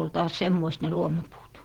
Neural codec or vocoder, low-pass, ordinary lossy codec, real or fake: vocoder, 48 kHz, 128 mel bands, Vocos; 19.8 kHz; Opus, 16 kbps; fake